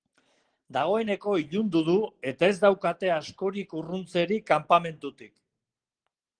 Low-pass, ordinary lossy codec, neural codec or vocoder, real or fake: 9.9 kHz; Opus, 16 kbps; none; real